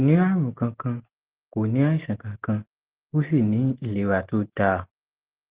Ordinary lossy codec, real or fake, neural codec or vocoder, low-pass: Opus, 16 kbps; real; none; 3.6 kHz